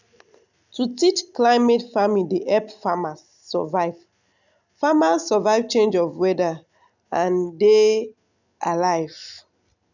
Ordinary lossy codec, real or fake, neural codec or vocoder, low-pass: none; real; none; 7.2 kHz